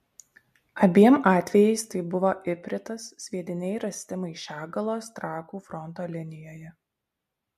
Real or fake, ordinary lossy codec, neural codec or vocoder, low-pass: real; MP3, 64 kbps; none; 14.4 kHz